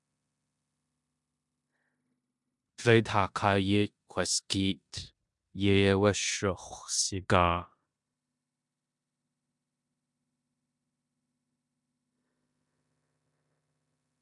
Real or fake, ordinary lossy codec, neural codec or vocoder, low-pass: fake; MP3, 96 kbps; codec, 16 kHz in and 24 kHz out, 0.9 kbps, LongCat-Audio-Codec, four codebook decoder; 10.8 kHz